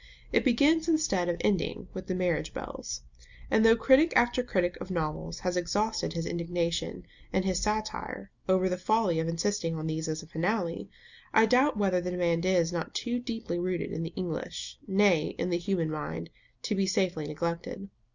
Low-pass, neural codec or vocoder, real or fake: 7.2 kHz; none; real